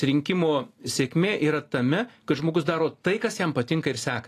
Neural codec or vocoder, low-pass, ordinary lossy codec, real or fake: none; 14.4 kHz; AAC, 48 kbps; real